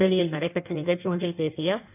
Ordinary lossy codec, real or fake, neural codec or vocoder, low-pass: none; fake; codec, 16 kHz in and 24 kHz out, 1.1 kbps, FireRedTTS-2 codec; 3.6 kHz